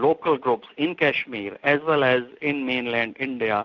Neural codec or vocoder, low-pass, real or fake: none; 7.2 kHz; real